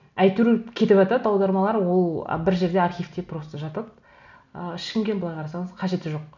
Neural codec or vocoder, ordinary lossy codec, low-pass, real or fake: none; none; 7.2 kHz; real